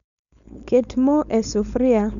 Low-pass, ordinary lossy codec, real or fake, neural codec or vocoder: 7.2 kHz; none; fake; codec, 16 kHz, 4.8 kbps, FACodec